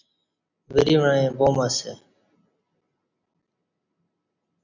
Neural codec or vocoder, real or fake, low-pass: none; real; 7.2 kHz